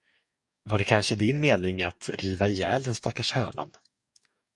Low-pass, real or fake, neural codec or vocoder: 10.8 kHz; fake; codec, 44.1 kHz, 2.6 kbps, DAC